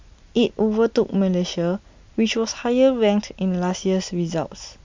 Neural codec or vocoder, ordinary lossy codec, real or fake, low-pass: none; MP3, 64 kbps; real; 7.2 kHz